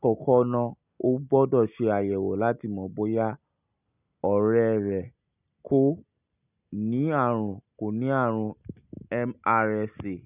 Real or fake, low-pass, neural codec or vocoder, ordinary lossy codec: real; 3.6 kHz; none; none